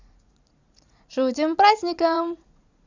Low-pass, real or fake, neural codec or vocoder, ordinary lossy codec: 7.2 kHz; fake; vocoder, 44.1 kHz, 80 mel bands, Vocos; Opus, 64 kbps